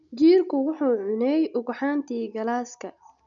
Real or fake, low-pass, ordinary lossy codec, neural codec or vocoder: real; 7.2 kHz; MP3, 64 kbps; none